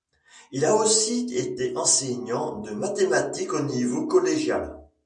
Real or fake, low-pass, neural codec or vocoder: real; 9.9 kHz; none